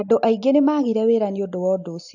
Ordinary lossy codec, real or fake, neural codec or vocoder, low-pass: AAC, 48 kbps; real; none; 7.2 kHz